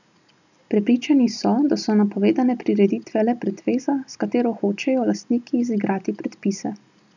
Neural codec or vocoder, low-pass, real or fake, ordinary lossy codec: none; 7.2 kHz; real; none